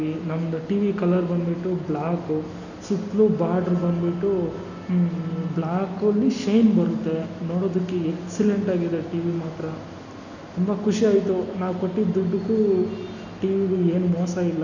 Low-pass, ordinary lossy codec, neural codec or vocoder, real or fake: 7.2 kHz; none; none; real